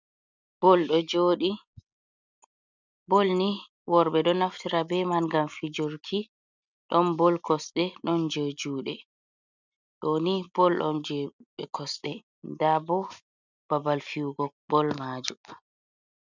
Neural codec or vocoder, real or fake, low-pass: none; real; 7.2 kHz